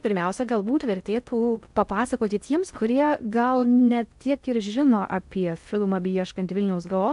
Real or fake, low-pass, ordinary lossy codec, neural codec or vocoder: fake; 10.8 kHz; AAC, 96 kbps; codec, 16 kHz in and 24 kHz out, 0.6 kbps, FocalCodec, streaming, 4096 codes